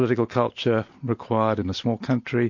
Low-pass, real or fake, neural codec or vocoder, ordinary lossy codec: 7.2 kHz; real; none; MP3, 48 kbps